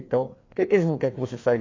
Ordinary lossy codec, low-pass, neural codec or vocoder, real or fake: AAC, 32 kbps; 7.2 kHz; codec, 16 kHz, 2 kbps, FreqCodec, larger model; fake